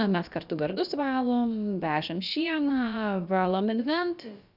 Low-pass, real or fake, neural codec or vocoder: 5.4 kHz; fake; codec, 16 kHz, about 1 kbps, DyCAST, with the encoder's durations